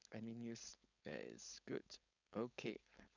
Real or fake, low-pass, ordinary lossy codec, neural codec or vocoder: fake; 7.2 kHz; none; codec, 16 kHz, 4.8 kbps, FACodec